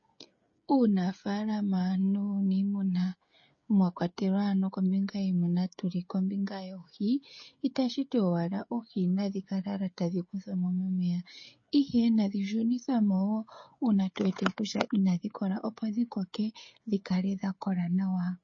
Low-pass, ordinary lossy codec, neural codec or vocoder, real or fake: 7.2 kHz; MP3, 32 kbps; codec, 16 kHz, 16 kbps, FreqCodec, smaller model; fake